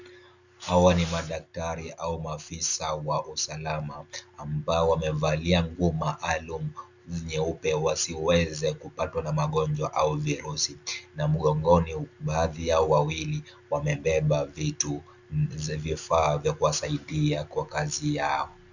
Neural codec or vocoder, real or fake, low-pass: none; real; 7.2 kHz